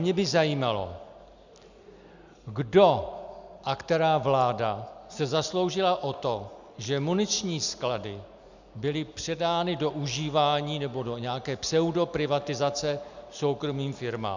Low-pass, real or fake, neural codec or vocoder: 7.2 kHz; real; none